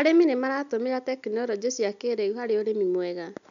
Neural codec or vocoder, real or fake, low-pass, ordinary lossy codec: none; real; 7.2 kHz; none